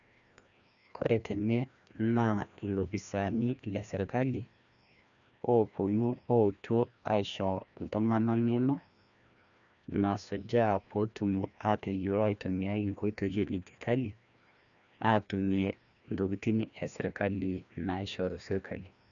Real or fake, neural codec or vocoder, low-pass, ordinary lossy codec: fake; codec, 16 kHz, 1 kbps, FreqCodec, larger model; 7.2 kHz; none